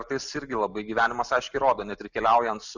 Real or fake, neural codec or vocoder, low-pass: real; none; 7.2 kHz